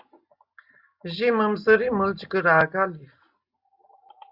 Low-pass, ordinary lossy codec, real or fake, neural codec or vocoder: 5.4 kHz; Opus, 64 kbps; real; none